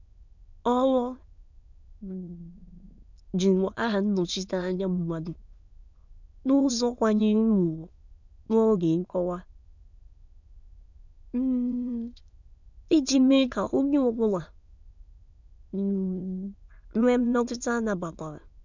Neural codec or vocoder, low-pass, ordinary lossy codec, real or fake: autoencoder, 22.05 kHz, a latent of 192 numbers a frame, VITS, trained on many speakers; 7.2 kHz; none; fake